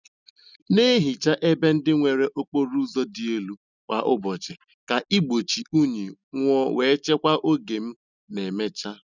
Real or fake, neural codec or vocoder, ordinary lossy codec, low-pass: real; none; none; 7.2 kHz